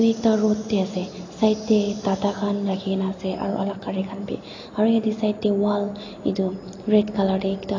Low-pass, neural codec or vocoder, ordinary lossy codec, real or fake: 7.2 kHz; none; AAC, 32 kbps; real